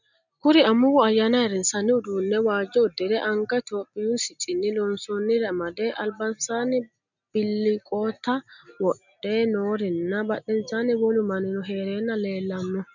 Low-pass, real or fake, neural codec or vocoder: 7.2 kHz; real; none